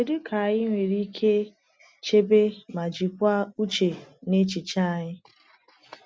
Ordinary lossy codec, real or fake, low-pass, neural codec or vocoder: none; real; none; none